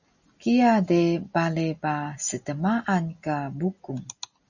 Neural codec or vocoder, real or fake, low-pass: none; real; 7.2 kHz